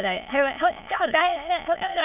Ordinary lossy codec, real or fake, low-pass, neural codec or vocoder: none; fake; 3.6 kHz; autoencoder, 22.05 kHz, a latent of 192 numbers a frame, VITS, trained on many speakers